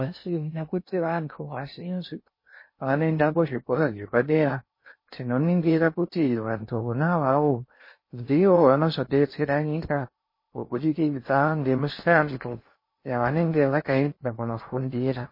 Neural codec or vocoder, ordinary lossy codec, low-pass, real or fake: codec, 16 kHz in and 24 kHz out, 0.6 kbps, FocalCodec, streaming, 2048 codes; MP3, 24 kbps; 5.4 kHz; fake